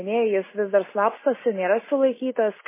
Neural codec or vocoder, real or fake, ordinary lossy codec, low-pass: none; real; MP3, 16 kbps; 3.6 kHz